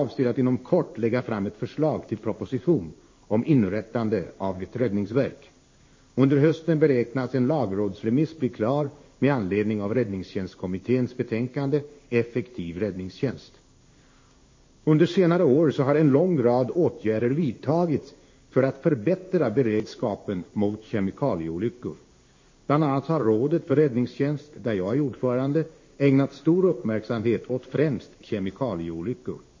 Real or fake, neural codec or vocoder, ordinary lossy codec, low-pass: fake; codec, 16 kHz in and 24 kHz out, 1 kbps, XY-Tokenizer; MP3, 32 kbps; 7.2 kHz